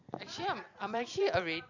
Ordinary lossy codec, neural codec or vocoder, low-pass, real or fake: none; vocoder, 44.1 kHz, 128 mel bands every 256 samples, BigVGAN v2; 7.2 kHz; fake